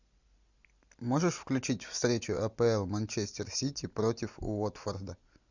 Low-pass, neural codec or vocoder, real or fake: 7.2 kHz; none; real